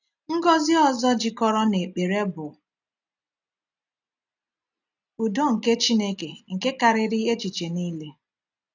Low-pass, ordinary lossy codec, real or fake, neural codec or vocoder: 7.2 kHz; none; real; none